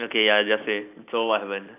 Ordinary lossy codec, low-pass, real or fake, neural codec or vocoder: none; 3.6 kHz; real; none